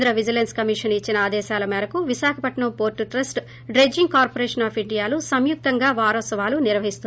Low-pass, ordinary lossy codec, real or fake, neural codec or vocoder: none; none; real; none